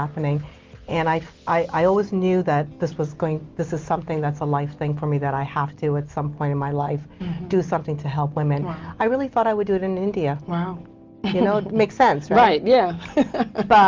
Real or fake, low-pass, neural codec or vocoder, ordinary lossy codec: real; 7.2 kHz; none; Opus, 32 kbps